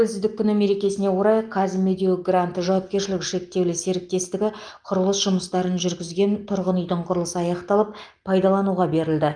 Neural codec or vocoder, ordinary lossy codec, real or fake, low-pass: none; Opus, 32 kbps; real; 9.9 kHz